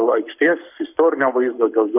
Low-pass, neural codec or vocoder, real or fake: 3.6 kHz; codec, 44.1 kHz, 7.8 kbps, Pupu-Codec; fake